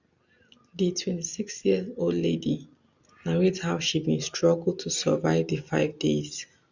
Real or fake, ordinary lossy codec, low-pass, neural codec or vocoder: real; none; 7.2 kHz; none